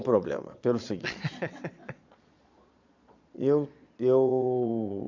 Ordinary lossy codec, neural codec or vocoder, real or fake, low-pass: MP3, 64 kbps; vocoder, 22.05 kHz, 80 mel bands, Vocos; fake; 7.2 kHz